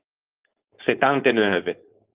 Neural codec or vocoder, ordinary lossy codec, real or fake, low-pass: codec, 16 kHz, 4.8 kbps, FACodec; Opus, 32 kbps; fake; 3.6 kHz